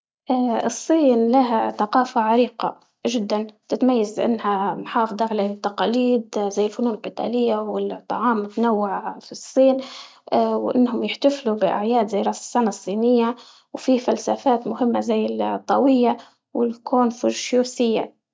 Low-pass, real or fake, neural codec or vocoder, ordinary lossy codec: none; real; none; none